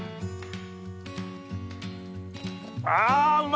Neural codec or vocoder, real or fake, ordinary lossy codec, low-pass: none; real; none; none